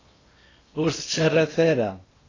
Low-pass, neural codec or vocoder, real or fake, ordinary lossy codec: 7.2 kHz; codec, 16 kHz in and 24 kHz out, 0.8 kbps, FocalCodec, streaming, 65536 codes; fake; AAC, 32 kbps